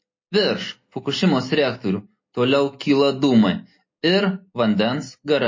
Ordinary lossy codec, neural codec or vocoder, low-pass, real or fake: MP3, 32 kbps; none; 7.2 kHz; real